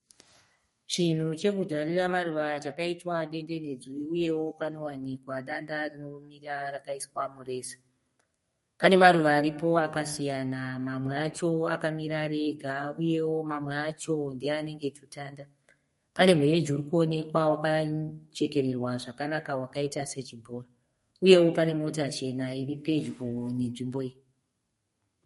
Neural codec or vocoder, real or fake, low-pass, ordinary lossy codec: codec, 32 kHz, 1.9 kbps, SNAC; fake; 14.4 kHz; MP3, 48 kbps